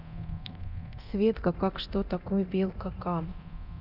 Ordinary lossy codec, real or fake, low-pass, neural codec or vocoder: none; fake; 5.4 kHz; codec, 24 kHz, 0.9 kbps, DualCodec